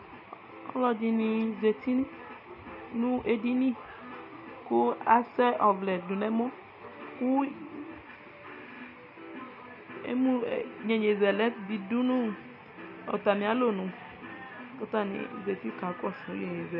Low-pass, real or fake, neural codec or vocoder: 5.4 kHz; real; none